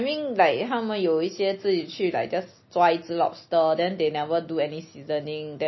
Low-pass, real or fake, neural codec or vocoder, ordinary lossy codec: 7.2 kHz; real; none; MP3, 24 kbps